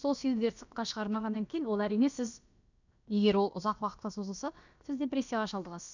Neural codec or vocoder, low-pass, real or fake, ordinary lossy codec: codec, 16 kHz, about 1 kbps, DyCAST, with the encoder's durations; 7.2 kHz; fake; none